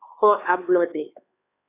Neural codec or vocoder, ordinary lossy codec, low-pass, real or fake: codec, 16 kHz, 4 kbps, X-Codec, HuBERT features, trained on LibriSpeech; AAC, 24 kbps; 3.6 kHz; fake